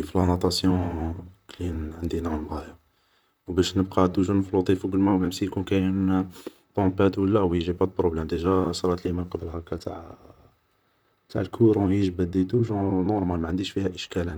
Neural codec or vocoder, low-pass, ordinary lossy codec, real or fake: vocoder, 44.1 kHz, 128 mel bands, Pupu-Vocoder; none; none; fake